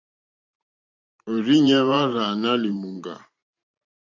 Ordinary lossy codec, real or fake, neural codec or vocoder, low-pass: MP3, 64 kbps; fake; vocoder, 44.1 kHz, 128 mel bands every 512 samples, BigVGAN v2; 7.2 kHz